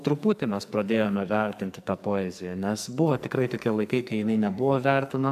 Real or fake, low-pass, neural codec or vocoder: fake; 14.4 kHz; codec, 44.1 kHz, 2.6 kbps, SNAC